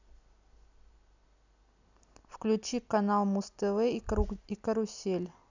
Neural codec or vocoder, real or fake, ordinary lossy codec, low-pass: none; real; none; 7.2 kHz